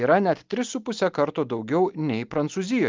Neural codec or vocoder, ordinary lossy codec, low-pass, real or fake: none; Opus, 32 kbps; 7.2 kHz; real